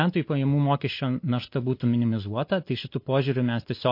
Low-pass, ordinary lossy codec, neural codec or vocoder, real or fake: 5.4 kHz; MP3, 32 kbps; none; real